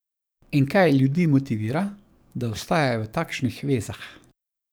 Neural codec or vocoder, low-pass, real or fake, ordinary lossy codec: codec, 44.1 kHz, 7.8 kbps, DAC; none; fake; none